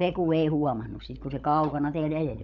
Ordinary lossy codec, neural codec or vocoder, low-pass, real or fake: none; codec, 16 kHz, 16 kbps, FunCodec, trained on LibriTTS, 50 frames a second; 7.2 kHz; fake